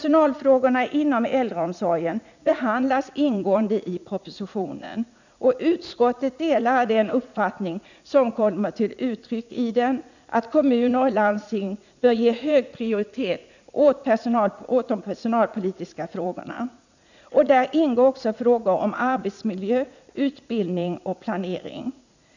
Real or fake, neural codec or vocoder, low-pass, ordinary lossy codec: fake; vocoder, 44.1 kHz, 80 mel bands, Vocos; 7.2 kHz; none